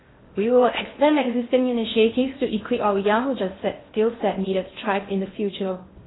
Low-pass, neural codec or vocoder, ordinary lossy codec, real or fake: 7.2 kHz; codec, 16 kHz in and 24 kHz out, 0.6 kbps, FocalCodec, streaming, 4096 codes; AAC, 16 kbps; fake